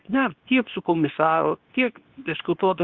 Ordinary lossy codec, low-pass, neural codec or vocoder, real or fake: Opus, 24 kbps; 7.2 kHz; codec, 24 kHz, 0.9 kbps, WavTokenizer, medium speech release version 2; fake